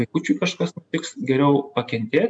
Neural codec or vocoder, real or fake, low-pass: none; real; 9.9 kHz